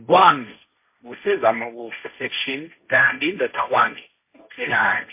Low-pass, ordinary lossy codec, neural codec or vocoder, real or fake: 3.6 kHz; MP3, 24 kbps; codec, 16 kHz, 1.1 kbps, Voila-Tokenizer; fake